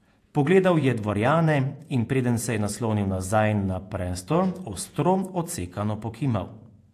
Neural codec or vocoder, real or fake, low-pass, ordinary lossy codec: vocoder, 48 kHz, 128 mel bands, Vocos; fake; 14.4 kHz; AAC, 64 kbps